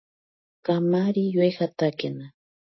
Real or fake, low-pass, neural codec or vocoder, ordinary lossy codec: real; 7.2 kHz; none; MP3, 24 kbps